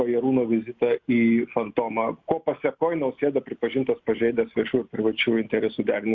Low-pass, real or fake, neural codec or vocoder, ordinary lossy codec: 7.2 kHz; real; none; Opus, 64 kbps